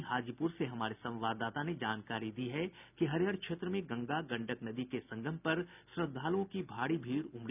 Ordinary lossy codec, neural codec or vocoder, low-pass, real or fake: none; none; 3.6 kHz; real